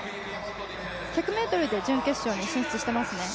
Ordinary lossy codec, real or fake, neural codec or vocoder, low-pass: none; real; none; none